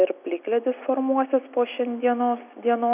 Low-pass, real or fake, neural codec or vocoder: 3.6 kHz; real; none